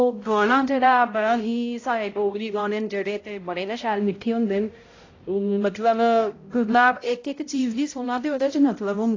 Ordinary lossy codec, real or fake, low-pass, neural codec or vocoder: AAC, 32 kbps; fake; 7.2 kHz; codec, 16 kHz, 0.5 kbps, X-Codec, HuBERT features, trained on balanced general audio